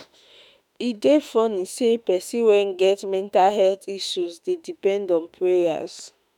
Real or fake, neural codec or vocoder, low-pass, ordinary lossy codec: fake; autoencoder, 48 kHz, 32 numbers a frame, DAC-VAE, trained on Japanese speech; 19.8 kHz; none